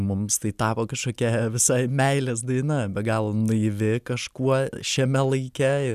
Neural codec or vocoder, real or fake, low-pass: none; real; 14.4 kHz